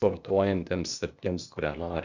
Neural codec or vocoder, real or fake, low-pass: codec, 16 kHz, 0.8 kbps, ZipCodec; fake; 7.2 kHz